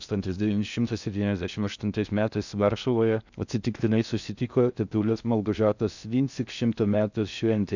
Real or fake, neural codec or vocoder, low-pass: fake; codec, 16 kHz in and 24 kHz out, 0.6 kbps, FocalCodec, streaming, 2048 codes; 7.2 kHz